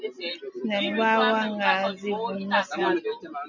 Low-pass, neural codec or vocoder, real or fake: 7.2 kHz; none; real